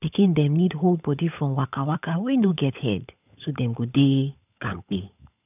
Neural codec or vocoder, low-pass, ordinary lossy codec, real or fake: codec, 16 kHz, 16 kbps, FunCodec, trained on LibriTTS, 50 frames a second; 3.6 kHz; none; fake